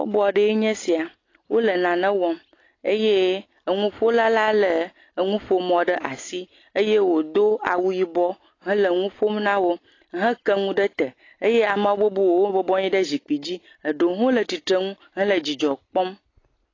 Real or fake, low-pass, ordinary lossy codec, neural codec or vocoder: real; 7.2 kHz; AAC, 32 kbps; none